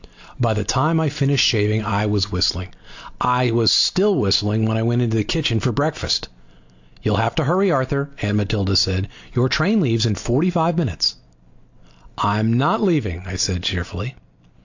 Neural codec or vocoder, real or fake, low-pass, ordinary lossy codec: none; real; 7.2 kHz; AAC, 48 kbps